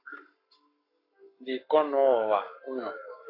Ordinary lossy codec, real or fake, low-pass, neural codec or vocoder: AAC, 32 kbps; fake; 5.4 kHz; codec, 44.1 kHz, 7.8 kbps, Pupu-Codec